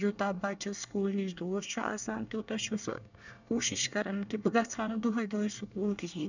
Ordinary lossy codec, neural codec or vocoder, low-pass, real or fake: none; codec, 24 kHz, 1 kbps, SNAC; 7.2 kHz; fake